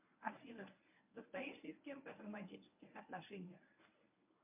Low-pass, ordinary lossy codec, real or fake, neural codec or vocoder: 3.6 kHz; MP3, 32 kbps; fake; codec, 24 kHz, 0.9 kbps, WavTokenizer, medium speech release version 1